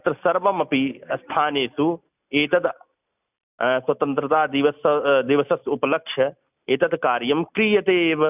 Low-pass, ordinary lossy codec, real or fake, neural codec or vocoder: 3.6 kHz; none; real; none